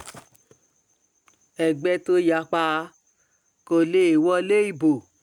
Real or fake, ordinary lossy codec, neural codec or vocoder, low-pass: real; none; none; 19.8 kHz